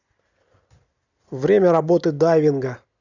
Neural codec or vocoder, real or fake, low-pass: none; real; 7.2 kHz